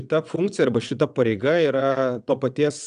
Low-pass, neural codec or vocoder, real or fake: 9.9 kHz; vocoder, 22.05 kHz, 80 mel bands, WaveNeXt; fake